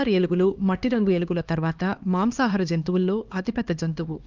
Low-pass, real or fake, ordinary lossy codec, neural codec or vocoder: 7.2 kHz; fake; Opus, 32 kbps; codec, 16 kHz, 2 kbps, X-Codec, HuBERT features, trained on LibriSpeech